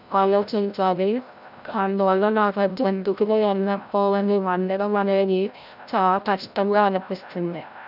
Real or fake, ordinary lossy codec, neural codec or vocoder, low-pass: fake; none; codec, 16 kHz, 0.5 kbps, FreqCodec, larger model; 5.4 kHz